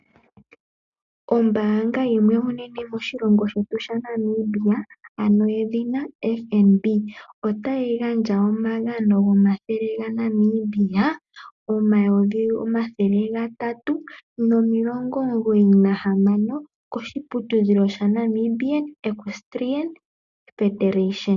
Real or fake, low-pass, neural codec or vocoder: real; 7.2 kHz; none